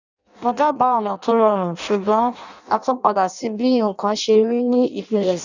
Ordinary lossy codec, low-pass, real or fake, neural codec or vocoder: none; 7.2 kHz; fake; codec, 16 kHz in and 24 kHz out, 0.6 kbps, FireRedTTS-2 codec